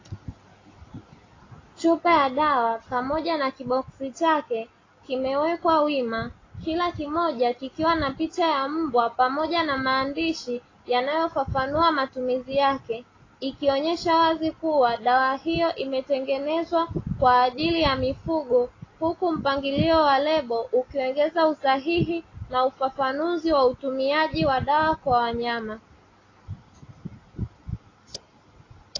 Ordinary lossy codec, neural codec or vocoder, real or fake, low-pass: AAC, 32 kbps; none; real; 7.2 kHz